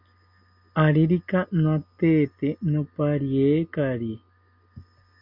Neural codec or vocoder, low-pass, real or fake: none; 5.4 kHz; real